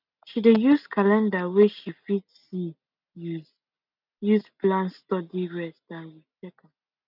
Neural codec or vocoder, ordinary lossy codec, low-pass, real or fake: none; none; 5.4 kHz; real